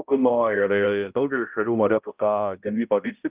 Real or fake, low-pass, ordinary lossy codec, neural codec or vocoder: fake; 3.6 kHz; Opus, 24 kbps; codec, 16 kHz, 0.5 kbps, X-Codec, HuBERT features, trained on balanced general audio